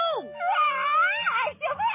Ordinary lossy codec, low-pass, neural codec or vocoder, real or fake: MP3, 16 kbps; 3.6 kHz; none; real